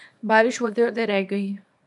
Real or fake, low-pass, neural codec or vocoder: fake; 10.8 kHz; codec, 24 kHz, 0.9 kbps, WavTokenizer, small release